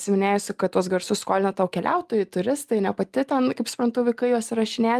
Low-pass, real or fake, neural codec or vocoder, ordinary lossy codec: 14.4 kHz; real; none; Opus, 32 kbps